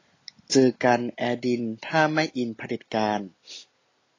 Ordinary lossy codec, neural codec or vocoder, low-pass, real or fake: AAC, 32 kbps; none; 7.2 kHz; real